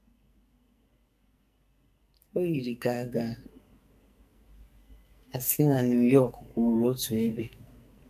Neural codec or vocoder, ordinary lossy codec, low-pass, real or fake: codec, 44.1 kHz, 2.6 kbps, SNAC; none; 14.4 kHz; fake